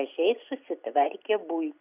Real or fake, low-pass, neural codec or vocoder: real; 3.6 kHz; none